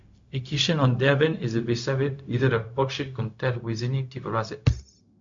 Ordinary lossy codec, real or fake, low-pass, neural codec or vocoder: MP3, 48 kbps; fake; 7.2 kHz; codec, 16 kHz, 0.4 kbps, LongCat-Audio-Codec